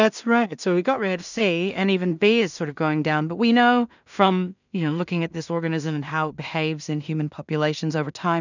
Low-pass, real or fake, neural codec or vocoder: 7.2 kHz; fake; codec, 16 kHz in and 24 kHz out, 0.4 kbps, LongCat-Audio-Codec, two codebook decoder